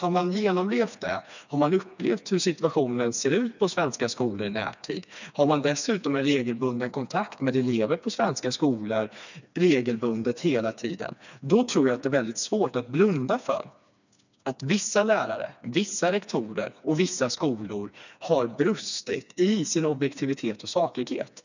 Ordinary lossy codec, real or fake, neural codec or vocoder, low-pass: none; fake; codec, 16 kHz, 2 kbps, FreqCodec, smaller model; 7.2 kHz